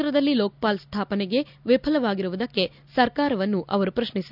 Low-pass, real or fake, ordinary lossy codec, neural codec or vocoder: 5.4 kHz; real; none; none